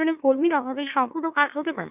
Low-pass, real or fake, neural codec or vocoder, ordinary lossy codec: 3.6 kHz; fake; autoencoder, 44.1 kHz, a latent of 192 numbers a frame, MeloTTS; none